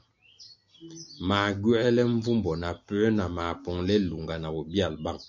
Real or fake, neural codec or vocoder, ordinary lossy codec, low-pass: real; none; MP3, 64 kbps; 7.2 kHz